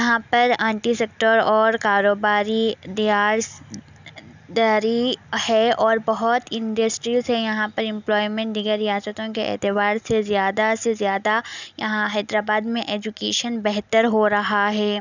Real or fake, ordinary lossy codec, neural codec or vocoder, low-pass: real; none; none; 7.2 kHz